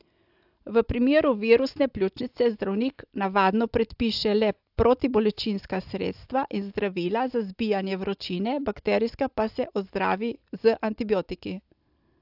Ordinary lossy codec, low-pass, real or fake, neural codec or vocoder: AAC, 48 kbps; 5.4 kHz; real; none